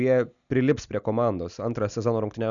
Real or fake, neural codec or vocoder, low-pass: real; none; 7.2 kHz